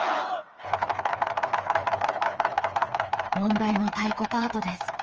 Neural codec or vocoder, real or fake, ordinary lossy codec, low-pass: codec, 16 kHz, 4 kbps, FreqCodec, smaller model; fake; Opus, 24 kbps; 7.2 kHz